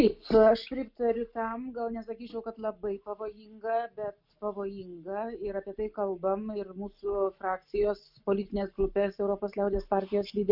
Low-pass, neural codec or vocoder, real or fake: 5.4 kHz; none; real